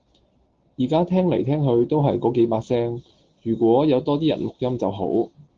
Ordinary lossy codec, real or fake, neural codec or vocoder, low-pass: Opus, 16 kbps; real; none; 7.2 kHz